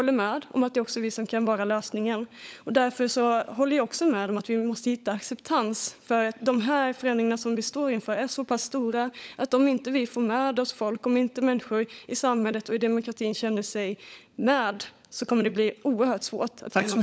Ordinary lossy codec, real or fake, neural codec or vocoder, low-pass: none; fake; codec, 16 kHz, 16 kbps, FunCodec, trained on LibriTTS, 50 frames a second; none